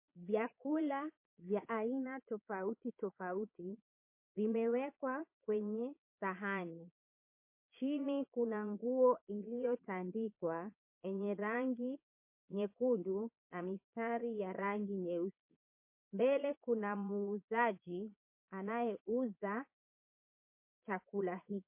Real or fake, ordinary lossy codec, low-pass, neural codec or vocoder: fake; MP3, 24 kbps; 3.6 kHz; vocoder, 22.05 kHz, 80 mel bands, Vocos